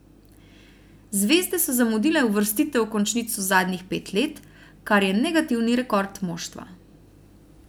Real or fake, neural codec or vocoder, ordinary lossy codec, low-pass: real; none; none; none